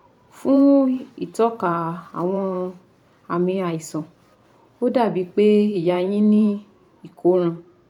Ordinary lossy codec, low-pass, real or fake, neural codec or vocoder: none; 19.8 kHz; fake; vocoder, 44.1 kHz, 128 mel bands every 512 samples, BigVGAN v2